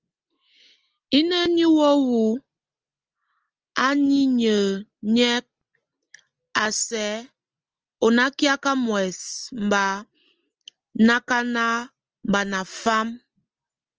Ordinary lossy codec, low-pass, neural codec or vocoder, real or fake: Opus, 24 kbps; 7.2 kHz; none; real